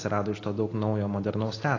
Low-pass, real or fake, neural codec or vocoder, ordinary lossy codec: 7.2 kHz; real; none; AAC, 32 kbps